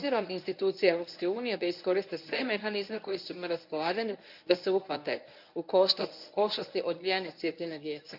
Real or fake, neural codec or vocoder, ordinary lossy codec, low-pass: fake; codec, 24 kHz, 0.9 kbps, WavTokenizer, medium speech release version 1; none; 5.4 kHz